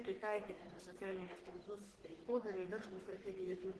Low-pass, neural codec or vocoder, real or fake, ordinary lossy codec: 10.8 kHz; codec, 44.1 kHz, 1.7 kbps, Pupu-Codec; fake; Opus, 16 kbps